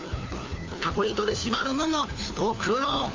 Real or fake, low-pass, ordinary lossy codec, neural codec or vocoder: fake; 7.2 kHz; AAC, 32 kbps; codec, 16 kHz, 4 kbps, FunCodec, trained on LibriTTS, 50 frames a second